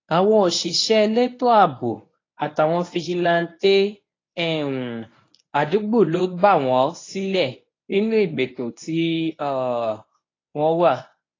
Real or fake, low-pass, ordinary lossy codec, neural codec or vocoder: fake; 7.2 kHz; AAC, 32 kbps; codec, 24 kHz, 0.9 kbps, WavTokenizer, medium speech release version 1